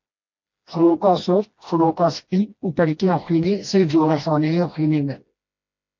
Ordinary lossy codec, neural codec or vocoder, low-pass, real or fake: MP3, 48 kbps; codec, 16 kHz, 1 kbps, FreqCodec, smaller model; 7.2 kHz; fake